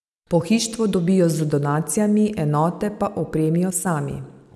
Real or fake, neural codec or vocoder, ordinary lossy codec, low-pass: real; none; none; none